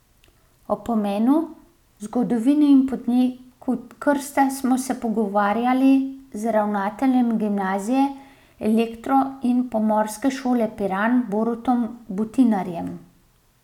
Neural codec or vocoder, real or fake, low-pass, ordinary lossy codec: none; real; 19.8 kHz; none